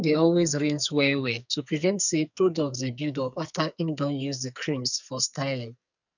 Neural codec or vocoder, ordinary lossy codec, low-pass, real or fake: codec, 44.1 kHz, 2.6 kbps, SNAC; none; 7.2 kHz; fake